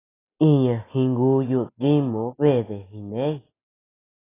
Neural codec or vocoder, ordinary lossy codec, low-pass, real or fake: none; AAC, 16 kbps; 3.6 kHz; real